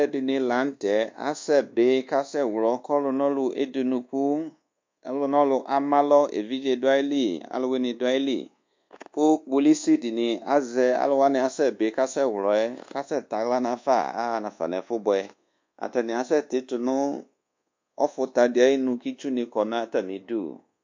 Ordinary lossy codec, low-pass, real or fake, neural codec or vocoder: MP3, 48 kbps; 7.2 kHz; fake; codec, 24 kHz, 1.2 kbps, DualCodec